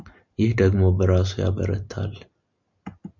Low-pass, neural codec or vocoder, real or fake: 7.2 kHz; none; real